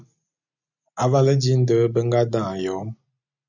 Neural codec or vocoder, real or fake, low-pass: none; real; 7.2 kHz